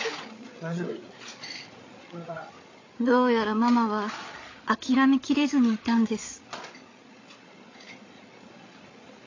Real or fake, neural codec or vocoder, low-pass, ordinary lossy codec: fake; codec, 16 kHz, 8 kbps, FreqCodec, larger model; 7.2 kHz; AAC, 32 kbps